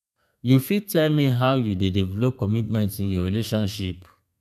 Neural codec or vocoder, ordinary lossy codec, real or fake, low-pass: codec, 32 kHz, 1.9 kbps, SNAC; none; fake; 14.4 kHz